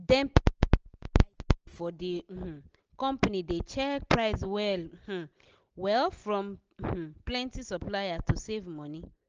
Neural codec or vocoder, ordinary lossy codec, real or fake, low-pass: none; Opus, 24 kbps; real; 7.2 kHz